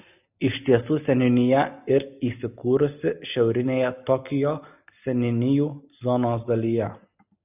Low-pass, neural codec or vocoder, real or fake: 3.6 kHz; none; real